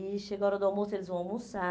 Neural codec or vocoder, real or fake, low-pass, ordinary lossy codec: none; real; none; none